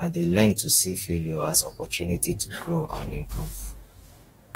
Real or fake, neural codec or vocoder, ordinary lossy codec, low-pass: fake; codec, 44.1 kHz, 2.6 kbps, DAC; AAC, 48 kbps; 19.8 kHz